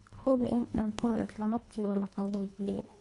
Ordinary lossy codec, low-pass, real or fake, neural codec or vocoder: none; 10.8 kHz; fake; codec, 24 kHz, 1.5 kbps, HILCodec